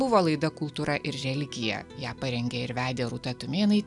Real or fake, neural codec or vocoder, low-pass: real; none; 10.8 kHz